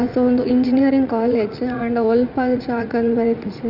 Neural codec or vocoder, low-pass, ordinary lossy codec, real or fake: vocoder, 44.1 kHz, 80 mel bands, Vocos; 5.4 kHz; none; fake